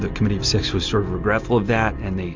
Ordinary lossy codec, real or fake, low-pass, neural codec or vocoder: MP3, 64 kbps; real; 7.2 kHz; none